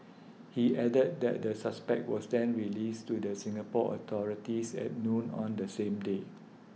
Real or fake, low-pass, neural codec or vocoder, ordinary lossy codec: real; none; none; none